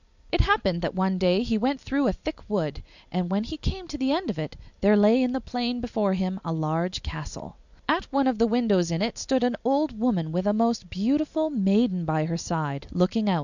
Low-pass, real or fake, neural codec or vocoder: 7.2 kHz; real; none